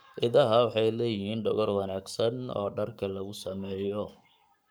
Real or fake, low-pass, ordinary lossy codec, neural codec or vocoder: fake; none; none; codec, 44.1 kHz, 7.8 kbps, Pupu-Codec